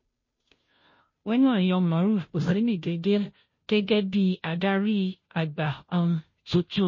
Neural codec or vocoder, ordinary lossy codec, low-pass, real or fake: codec, 16 kHz, 0.5 kbps, FunCodec, trained on Chinese and English, 25 frames a second; MP3, 32 kbps; 7.2 kHz; fake